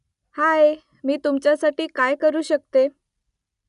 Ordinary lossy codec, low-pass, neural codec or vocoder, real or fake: none; 10.8 kHz; none; real